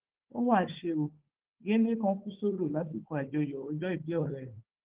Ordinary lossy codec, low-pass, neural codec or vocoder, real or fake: Opus, 16 kbps; 3.6 kHz; codec, 16 kHz, 4 kbps, FunCodec, trained on Chinese and English, 50 frames a second; fake